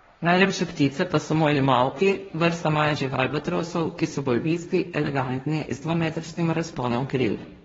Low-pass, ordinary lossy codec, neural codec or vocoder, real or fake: 7.2 kHz; AAC, 24 kbps; codec, 16 kHz, 1.1 kbps, Voila-Tokenizer; fake